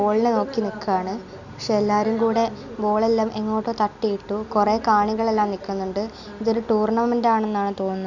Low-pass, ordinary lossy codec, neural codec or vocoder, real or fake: 7.2 kHz; none; none; real